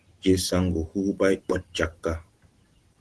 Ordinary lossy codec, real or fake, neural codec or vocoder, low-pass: Opus, 16 kbps; real; none; 10.8 kHz